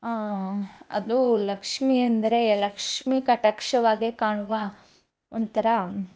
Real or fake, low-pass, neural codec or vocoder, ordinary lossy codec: fake; none; codec, 16 kHz, 0.8 kbps, ZipCodec; none